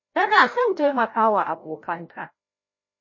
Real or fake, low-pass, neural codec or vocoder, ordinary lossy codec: fake; 7.2 kHz; codec, 16 kHz, 0.5 kbps, FreqCodec, larger model; MP3, 32 kbps